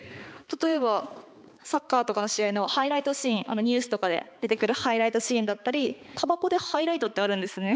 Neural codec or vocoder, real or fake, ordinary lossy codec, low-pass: codec, 16 kHz, 4 kbps, X-Codec, HuBERT features, trained on balanced general audio; fake; none; none